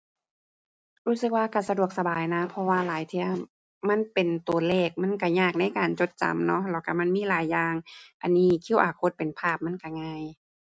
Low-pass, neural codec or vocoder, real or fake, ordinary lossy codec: none; none; real; none